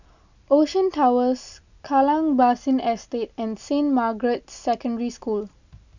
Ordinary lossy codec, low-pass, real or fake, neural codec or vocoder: none; 7.2 kHz; real; none